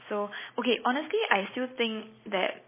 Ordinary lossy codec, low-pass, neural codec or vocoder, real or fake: MP3, 16 kbps; 3.6 kHz; none; real